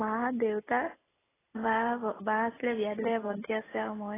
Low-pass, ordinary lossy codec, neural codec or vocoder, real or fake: 3.6 kHz; AAC, 16 kbps; none; real